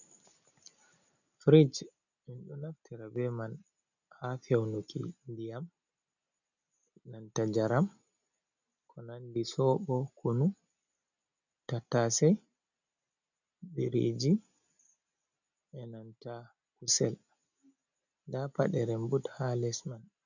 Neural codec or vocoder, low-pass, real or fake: none; 7.2 kHz; real